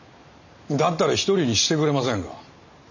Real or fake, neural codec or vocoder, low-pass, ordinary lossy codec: real; none; 7.2 kHz; none